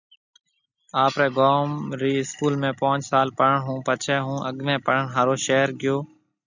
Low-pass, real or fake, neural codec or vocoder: 7.2 kHz; real; none